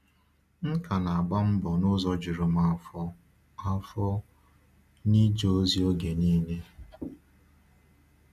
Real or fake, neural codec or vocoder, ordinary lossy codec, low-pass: real; none; none; 14.4 kHz